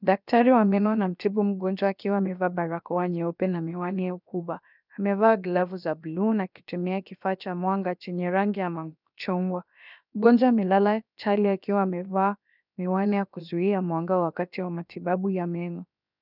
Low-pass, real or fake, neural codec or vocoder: 5.4 kHz; fake; codec, 16 kHz, about 1 kbps, DyCAST, with the encoder's durations